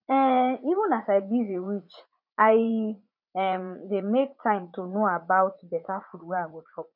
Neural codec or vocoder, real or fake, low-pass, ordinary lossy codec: vocoder, 44.1 kHz, 80 mel bands, Vocos; fake; 5.4 kHz; none